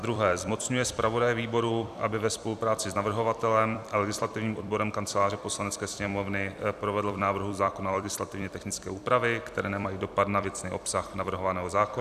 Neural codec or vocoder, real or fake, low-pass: vocoder, 44.1 kHz, 128 mel bands every 256 samples, BigVGAN v2; fake; 14.4 kHz